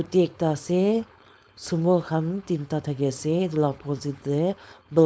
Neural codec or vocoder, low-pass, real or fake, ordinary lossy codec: codec, 16 kHz, 4.8 kbps, FACodec; none; fake; none